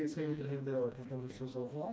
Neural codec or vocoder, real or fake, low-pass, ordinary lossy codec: codec, 16 kHz, 1 kbps, FreqCodec, smaller model; fake; none; none